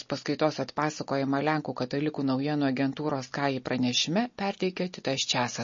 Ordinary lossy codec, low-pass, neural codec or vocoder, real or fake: MP3, 32 kbps; 7.2 kHz; none; real